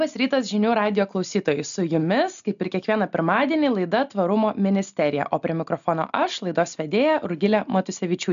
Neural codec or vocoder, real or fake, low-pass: none; real; 7.2 kHz